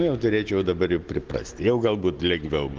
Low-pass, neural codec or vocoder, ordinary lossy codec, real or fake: 7.2 kHz; none; Opus, 16 kbps; real